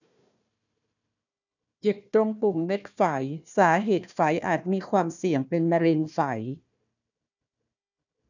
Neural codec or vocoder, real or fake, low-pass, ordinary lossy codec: codec, 16 kHz, 1 kbps, FunCodec, trained on Chinese and English, 50 frames a second; fake; 7.2 kHz; none